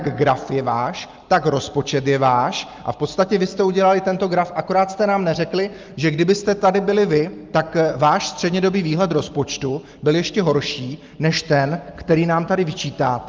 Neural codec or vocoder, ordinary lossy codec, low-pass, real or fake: none; Opus, 24 kbps; 7.2 kHz; real